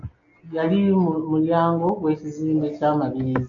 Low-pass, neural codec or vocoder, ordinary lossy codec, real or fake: 7.2 kHz; none; AAC, 64 kbps; real